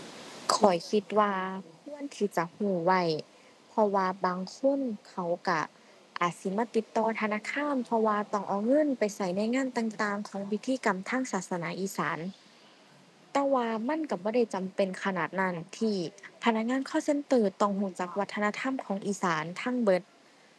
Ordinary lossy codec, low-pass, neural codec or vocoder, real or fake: none; none; none; real